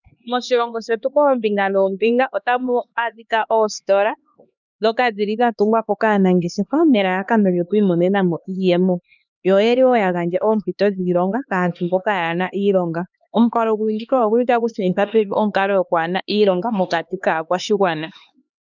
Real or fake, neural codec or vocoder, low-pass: fake; codec, 16 kHz, 2 kbps, X-Codec, HuBERT features, trained on LibriSpeech; 7.2 kHz